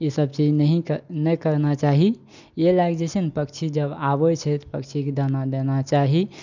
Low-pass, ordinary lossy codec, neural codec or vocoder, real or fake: 7.2 kHz; none; none; real